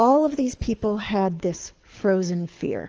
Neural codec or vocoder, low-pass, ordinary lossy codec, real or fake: codec, 24 kHz, 6 kbps, HILCodec; 7.2 kHz; Opus, 24 kbps; fake